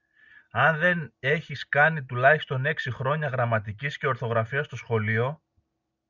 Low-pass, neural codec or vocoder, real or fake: 7.2 kHz; none; real